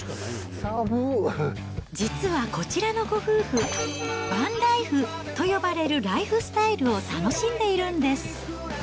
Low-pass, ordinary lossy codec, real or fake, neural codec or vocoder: none; none; real; none